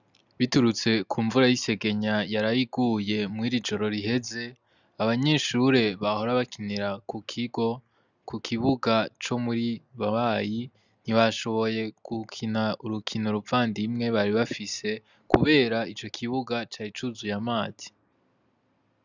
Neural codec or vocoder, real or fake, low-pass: none; real; 7.2 kHz